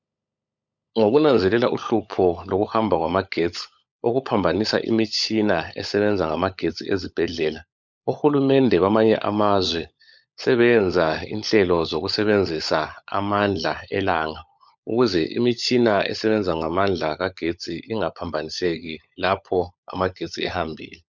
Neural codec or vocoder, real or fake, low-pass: codec, 16 kHz, 16 kbps, FunCodec, trained on LibriTTS, 50 frames a second; fake; 7.2 kHz